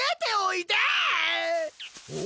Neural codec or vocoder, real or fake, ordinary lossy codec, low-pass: none; real; none; none